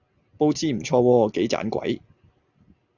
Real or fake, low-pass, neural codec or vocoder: real; 7.2 kHz; none